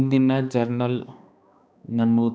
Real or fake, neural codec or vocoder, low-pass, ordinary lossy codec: fake; codec, 16 kHz, 2 kbps, X-Codec, HuBERT features, trained on balanced general audio; none; none